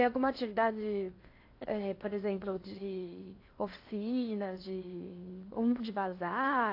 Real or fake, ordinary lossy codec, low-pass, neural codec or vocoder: fake; AAC, 32 kbps; 5.4 kHz; codec, 16 kHz in and 24 kHz out, 0.8 kbps, FocalCodec, streaming, 65536 codes